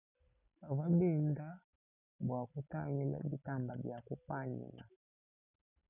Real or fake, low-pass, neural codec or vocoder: real; 3.6 kHz; none